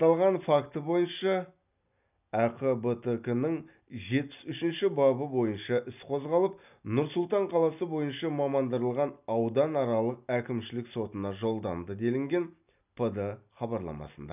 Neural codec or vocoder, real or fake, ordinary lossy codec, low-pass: none; real; none; 3.6 kHz